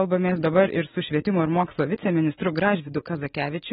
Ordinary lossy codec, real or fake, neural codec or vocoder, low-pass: AAC, 16 kbps; real; none; 9.9 kHz